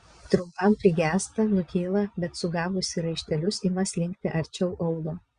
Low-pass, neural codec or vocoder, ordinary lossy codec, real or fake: 9.9 kHz; vocoder, 22.05 kHz, 80 mel bands, Vocos; Opus, 64 kbps; fake